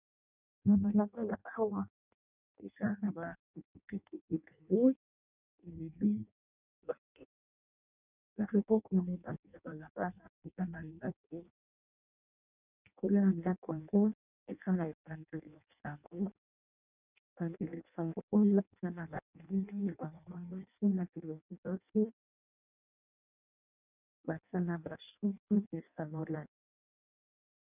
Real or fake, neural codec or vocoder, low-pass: fake; codec, 16 kHz in and 24 kHz out, 0.6 kbps, FireRedTTS-2 codec; 3.6 kHz